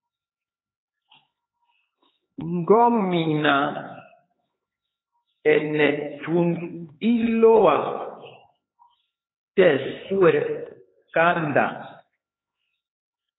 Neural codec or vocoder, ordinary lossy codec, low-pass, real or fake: codec, 16 kHz, 4 kbps, X-Codec, HuBERT features, trained on LibriSpeech; AAC, 16 kbps; 7.2 kHz; fake